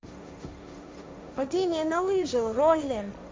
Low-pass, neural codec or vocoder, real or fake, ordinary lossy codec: none; codec, 16 kHz, 1.1 kbps, Voila-Tokenizer; fake; none